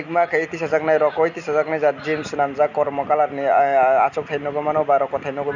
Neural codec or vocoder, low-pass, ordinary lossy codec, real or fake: none; 7.2 kHz; none; real